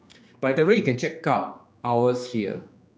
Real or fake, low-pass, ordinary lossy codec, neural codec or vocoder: fake; none; none; codec, 16 kHz, 2 kbps, X-Codec, HuBERT features, trained on general audio